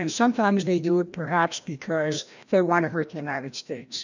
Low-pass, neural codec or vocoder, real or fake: 7.2 kHz; codec, 16 kHz, 1 kbps, FreqCodec, larger model; fake